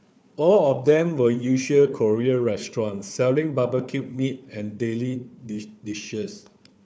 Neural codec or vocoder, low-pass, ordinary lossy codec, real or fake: codec, 16 kHz, 4 kbps, FunCodec, trained on Chinese and English, 50 frames a second; none; none; fake